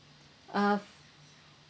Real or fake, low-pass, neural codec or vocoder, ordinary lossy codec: real; none; none; none